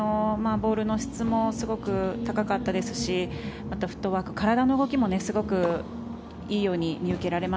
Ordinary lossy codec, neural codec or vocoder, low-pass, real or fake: none; none; none; real